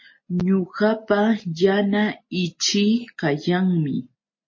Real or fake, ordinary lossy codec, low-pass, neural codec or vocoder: real; MP3, 32 kbps; 7.2 kHz; none